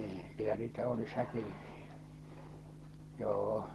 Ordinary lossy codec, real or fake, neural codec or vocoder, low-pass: Opus, 16 kbps; real; none; 19.8 kHz